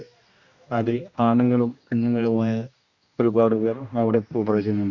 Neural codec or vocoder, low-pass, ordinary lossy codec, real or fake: codec, 16 kHz, 1 kbps, X-Codec, HuBERT features, trained on general audio; 7.2 kHz; Opus, 64 kbps; fake